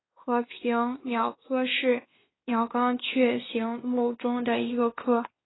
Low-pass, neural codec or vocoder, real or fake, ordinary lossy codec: 7.2 kHz; codec, 16 kHz, 2 kbps, X-Codec, WavLM features, trained on Multilingual LibriSpeech; fake; AAC, 16 kbps